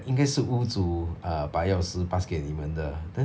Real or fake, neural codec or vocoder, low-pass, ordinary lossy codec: real; none; none; none